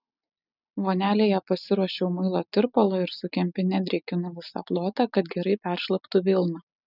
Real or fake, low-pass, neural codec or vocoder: real; 5.4 kHz; none